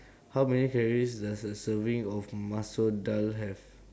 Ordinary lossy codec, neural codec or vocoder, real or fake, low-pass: none; none; real; none